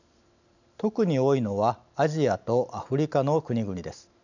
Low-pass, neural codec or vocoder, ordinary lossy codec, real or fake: 7.2 kHz; none; none; real